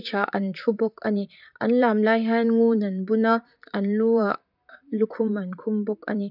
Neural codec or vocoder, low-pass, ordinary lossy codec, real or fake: vocoder, 44.1 kHz, 128 mel bands, Pupu-Vocoder; 5.4 kHz; none; fake